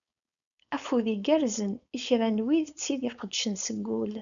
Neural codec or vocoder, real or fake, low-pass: codec, 16 kHz, 4.8 kbps, FACodec; fake; 7.2 kHz